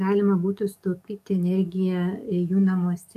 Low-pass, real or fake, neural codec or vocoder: 14.4 kHz; fake; codec, 44.1 kHz, 7.8 kbps, DAC